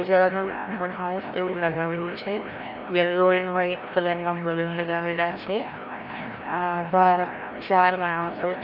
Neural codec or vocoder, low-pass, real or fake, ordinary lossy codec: codec, 16 kHz, 1 kbps, FreqCodec, larger model; 5.4 kHz; fake; none